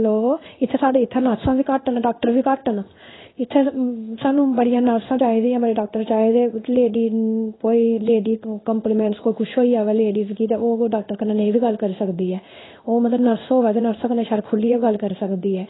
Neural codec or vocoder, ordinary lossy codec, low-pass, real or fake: codec, 16 kHz in and 24 kHz out, 1 kbps, XY-Tokenizer; AAC, 16 kbps; 7.2 kHz; fake